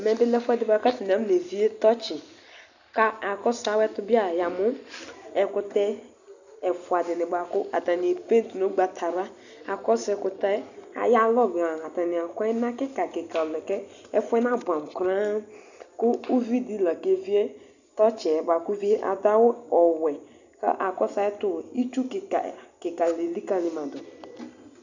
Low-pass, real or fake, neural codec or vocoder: 7.2 kHz; real; none